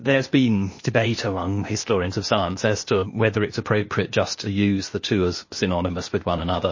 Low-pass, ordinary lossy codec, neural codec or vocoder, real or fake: 7.2 kHz; MP3, 32 kbps; codec, 16 kHz, 0.8 kbps, ZipCodec; fake